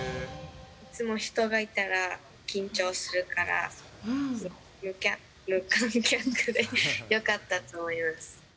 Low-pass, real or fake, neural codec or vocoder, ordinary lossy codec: none; real; none; none